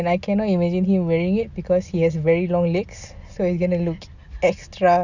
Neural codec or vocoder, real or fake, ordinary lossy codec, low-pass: none; real; none; 7.2 kHz